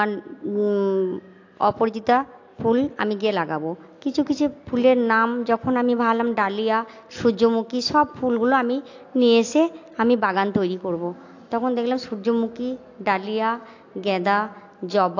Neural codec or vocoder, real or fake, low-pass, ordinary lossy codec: none; real; 7.2 kHz; AAC, 48 kbps